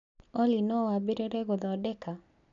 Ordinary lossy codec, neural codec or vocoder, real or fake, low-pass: none; none; real; 7.2 kHz